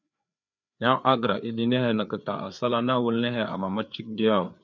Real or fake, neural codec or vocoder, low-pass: fake; codec, 16 kHz, 4 kbps, FreqCodec, larger model; 7.2 kHz